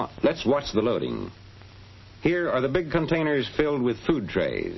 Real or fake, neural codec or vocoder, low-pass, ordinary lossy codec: real; none; 7.2 kHz; MP3, 24 kbps